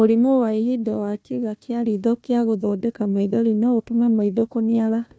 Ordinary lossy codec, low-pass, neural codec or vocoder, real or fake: none; none; codec, 16 kHz, 1 kbps, FunCodec, trained on Chinese and English, 50 frames a second; fake